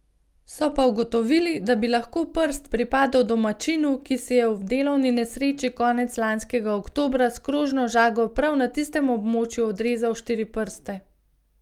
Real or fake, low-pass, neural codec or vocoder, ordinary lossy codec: real; 19.8 kHz; none; Opus, 32 kbps